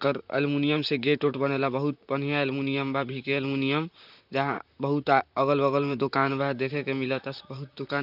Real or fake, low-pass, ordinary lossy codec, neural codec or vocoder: fake; 5.4 kHz; none; vocoder, 44.1 kHz, 128 mel bands, Pupu-Vocoder